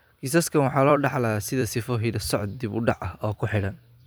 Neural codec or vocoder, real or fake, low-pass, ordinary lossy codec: vocoder, 44.1 kHz, 128 mel bands every 256 samples, BigVGAN v2; fake; none; none